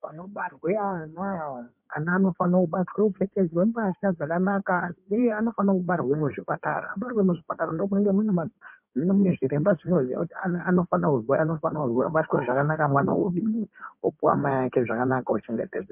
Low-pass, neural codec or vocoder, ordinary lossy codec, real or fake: 3.6 kHz; codec, 16 kHz in and 24 kHz out, 2.2 kbps, FireRedTTS-2 codec; MP3, 32 kbps; fake